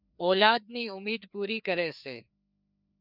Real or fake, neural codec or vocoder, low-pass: fake; codec, 16 kHz, 2 kbps, FreqCodec, larger model; 5.4 kHz